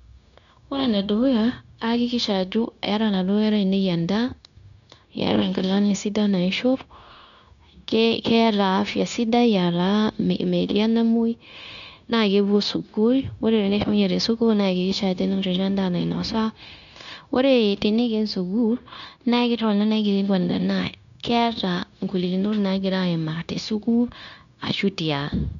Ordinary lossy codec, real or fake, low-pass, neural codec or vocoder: none; fake; 7.2 kHz; codec, 16 kHz, 0.9 kbps, LongCat-Audio-Codec